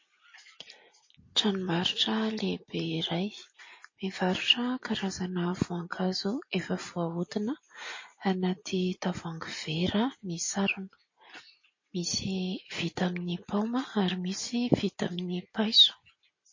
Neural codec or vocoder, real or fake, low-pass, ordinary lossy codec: none; real; 7.2 kHz; MP3, 32 kbps